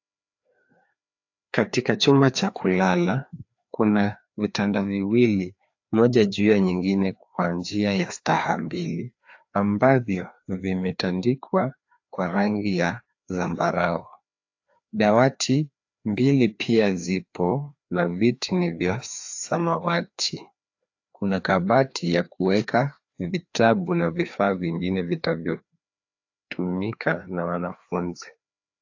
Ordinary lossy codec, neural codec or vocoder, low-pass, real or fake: AAC, 48 kbps; codec, 16 kHz, 2 kbps, FreqCodec, larger model; 7.2 kHz; fake